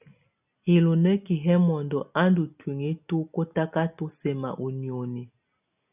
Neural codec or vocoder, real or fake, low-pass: none; real; 3.6 kHz